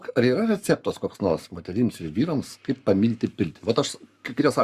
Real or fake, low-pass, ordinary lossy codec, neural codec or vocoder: fake; 14.4 kHz; Opus, 64 kbps; codec, 44.1 kHz, 7.8 kbps, Pupu-Codec